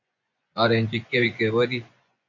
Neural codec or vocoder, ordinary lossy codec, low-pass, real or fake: vocoder, 24 kHz, 100 mel bands, Vocos; MP3, 64 kbps; 7.2 kHz; fake